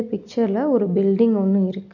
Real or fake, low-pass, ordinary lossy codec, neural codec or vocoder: real; 7.2 kHz; none; none